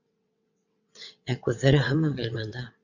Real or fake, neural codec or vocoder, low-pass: fake; vocoder, 22.05 kHz, 80 mel bands, WaveNeXt; 7.2 kHz